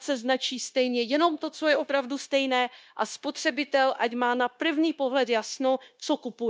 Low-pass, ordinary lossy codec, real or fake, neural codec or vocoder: none; none; fake; codec, 16 kHz, 0.9 kbps, LongCat-Audio-Codec